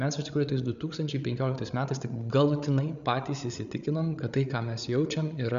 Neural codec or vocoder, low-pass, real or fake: codec, 16 kHz, 8 kbps, FreqCodec, larger model; 7.2 kHz; fake